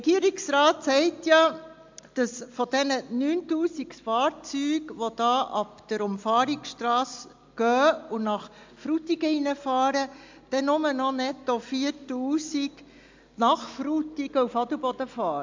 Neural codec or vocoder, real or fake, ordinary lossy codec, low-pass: none; real; MP3, 64 kbps; 7.2 kHz